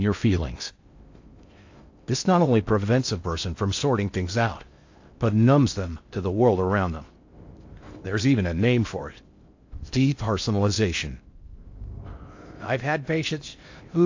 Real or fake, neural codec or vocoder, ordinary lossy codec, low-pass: fake; codec, 16 kHz in and 24 kHz out, 0.6 kbps, FocalCodec, streaming, 4096 codes; AAC, 48 kbps; 7.2 kHz